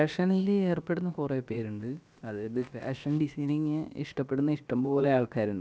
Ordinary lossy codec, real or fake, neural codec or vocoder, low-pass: none; fake; codec, 16 kHz, about 1 kbps, DyCAST, with the encoder's durations; none